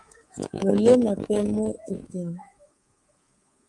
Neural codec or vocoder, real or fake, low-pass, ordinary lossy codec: codec, 24 kHz, 3.1 kbps, DualCodec; fake; 10.8 kHz; Opus, 32 kbps